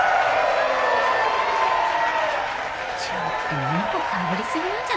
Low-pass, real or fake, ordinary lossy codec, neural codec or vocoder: none; real; none; none